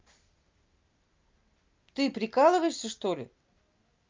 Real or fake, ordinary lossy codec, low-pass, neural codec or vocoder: real; Opus, 24 kbps; 7.2 kHz; none